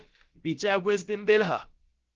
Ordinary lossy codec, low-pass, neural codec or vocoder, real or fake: Opus, 16 kbps; 7.2 kHz; codec, 16 kHz, about 1 kbps, DyCAST, with the encoder's durations; fake